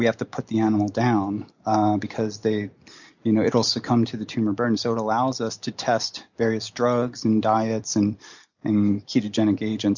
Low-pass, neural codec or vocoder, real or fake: 7.2 kHz; none; real